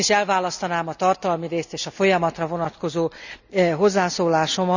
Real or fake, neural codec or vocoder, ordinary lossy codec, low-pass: real; none; none; 7.2 kHz